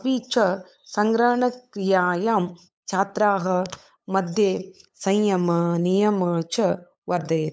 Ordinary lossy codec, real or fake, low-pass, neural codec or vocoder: none; fake; none; codec, 16 kHz, 8 kbps, FunCodec, trained on LibriTTS, 25 frames a second